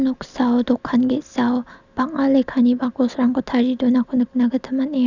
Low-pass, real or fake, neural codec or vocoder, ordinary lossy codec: 7.2 kHz; real; none; none